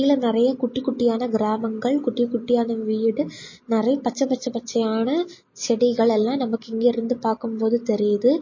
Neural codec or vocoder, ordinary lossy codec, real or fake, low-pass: none; MP3, 32 kbps; real; 7.2 kHz